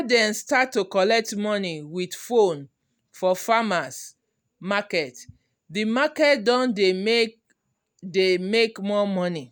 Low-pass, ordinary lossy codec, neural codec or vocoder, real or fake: none; none; none; real